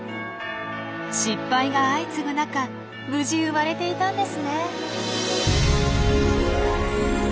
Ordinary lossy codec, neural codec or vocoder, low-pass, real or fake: none; none; none; real